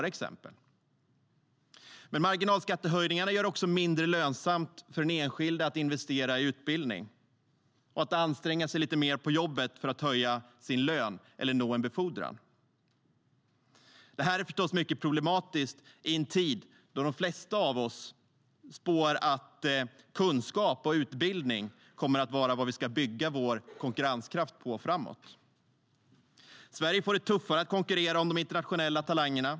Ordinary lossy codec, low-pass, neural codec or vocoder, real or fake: none; none; none; real